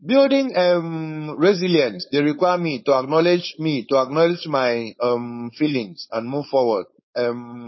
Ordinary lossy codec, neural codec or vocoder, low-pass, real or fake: MP3, 24 kbps; codec, 16 kHz, 4.8 kbps, FACodec; 7.2 kHz; fake